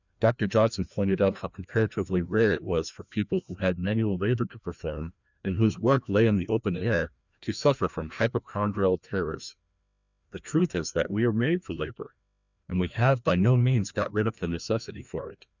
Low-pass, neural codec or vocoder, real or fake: 7.2 kHz; codec, 16 kHz, 1 kbps, FreqCodec, larger model; fake